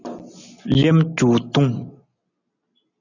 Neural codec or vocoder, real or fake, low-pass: none; real; 7.2 kHz